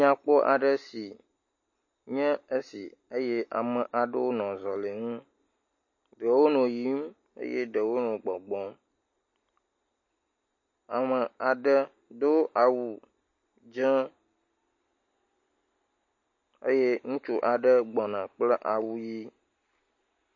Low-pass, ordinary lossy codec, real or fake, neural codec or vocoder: 7.2 kHz; MP3, 32 kbps; real; none